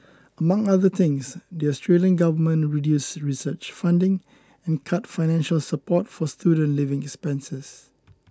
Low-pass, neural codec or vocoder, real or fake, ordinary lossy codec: none; none; real; none